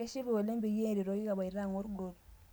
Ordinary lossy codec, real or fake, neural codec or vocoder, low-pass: none; real; none; none